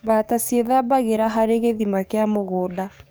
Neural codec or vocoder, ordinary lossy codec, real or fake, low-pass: codec, 44.1 kHz, 7.8 kbps, DAC; none; fake; none